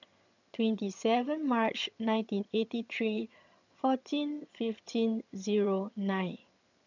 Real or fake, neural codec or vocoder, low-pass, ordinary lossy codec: fake; vocoder, 22.05 kHz, 80 mel bands, HiFi-GAN; 7.2 kHz; none